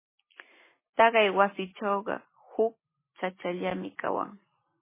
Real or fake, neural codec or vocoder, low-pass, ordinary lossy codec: real; none; 3.6 kHz; MP3, 16 kbps